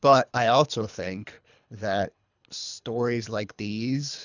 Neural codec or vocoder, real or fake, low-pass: codec, 24 kHz, 3 kbps, HILCodec; fake; 7.2 kHz